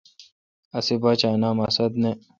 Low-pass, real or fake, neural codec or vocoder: 7.2 kHz; real; none